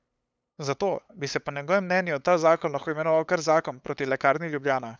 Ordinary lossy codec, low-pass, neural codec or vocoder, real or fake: none; none; codec, 16 kHz, 8 kbps, FunCodec, trained on LibriTTS, 25 frames a second; fake